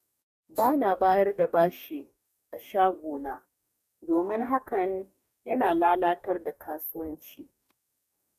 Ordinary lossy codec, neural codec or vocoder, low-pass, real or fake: none; codec, 44.1 kHz, 2.6 kbps, DAC; 14.4 kHz; fake